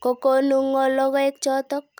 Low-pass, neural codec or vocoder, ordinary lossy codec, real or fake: none; none; none; real